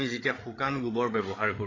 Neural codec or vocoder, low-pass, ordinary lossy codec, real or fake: codec, 16 kHz, 8 kbps, FreqCodec, larger model; 7.2 kHz; AAC, 32 kbps; fake